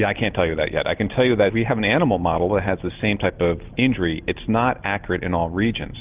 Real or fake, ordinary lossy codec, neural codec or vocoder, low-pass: real; Opus, 32 kbps; none; 3.6 kHz